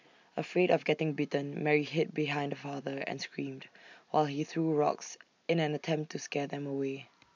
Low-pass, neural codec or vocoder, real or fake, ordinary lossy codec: 7.2 kHz; none; real; MP3, 64 kbps